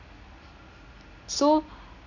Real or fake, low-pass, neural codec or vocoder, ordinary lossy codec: real; 7.2 kHz; none; AAC, 48 kbps